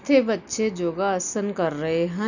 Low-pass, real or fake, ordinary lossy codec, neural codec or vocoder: 7.2 kHz; real; none; none